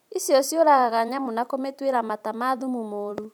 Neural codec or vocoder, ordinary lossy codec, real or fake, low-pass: vocoder, 44.1 kHz, 128 mel bands every 256 samples, BigVGAN v2; none; fake; 19.8 kHz